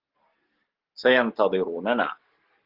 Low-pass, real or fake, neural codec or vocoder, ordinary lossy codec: 5.4 kHz; real; none; Opus, 16 kbps